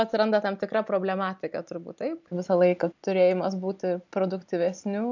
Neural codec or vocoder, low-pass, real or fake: none; 7.2 kHz; real